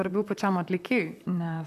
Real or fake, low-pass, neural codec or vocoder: fake; 14.4 kHz; vocoder, 44.1 kHz, 128 mel bands, Pupu-Vocoder